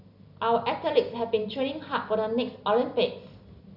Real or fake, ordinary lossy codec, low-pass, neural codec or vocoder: real; none; 5.4 kHz; none